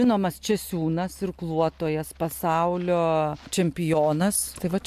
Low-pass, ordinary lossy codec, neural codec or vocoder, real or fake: 14.4 kHz; MP3, 96 kbps; none; real